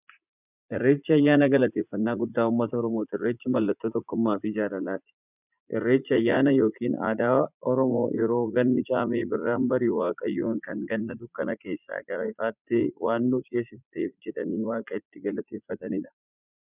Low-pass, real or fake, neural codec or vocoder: 3.6 kHz; fake; vocoder, 44.1 kHz, 80 mel bands, Vocos